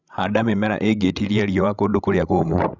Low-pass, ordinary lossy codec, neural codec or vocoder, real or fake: 7.2 kHz; none; codec, 16 kHz, 16 kbps, FreqCodec, larger model; fake